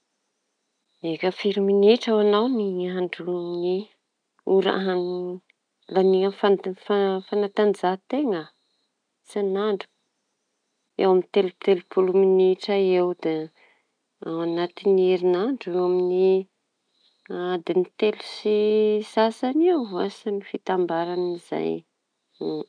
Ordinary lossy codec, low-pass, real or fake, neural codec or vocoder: none; 9.9 kHz; real; none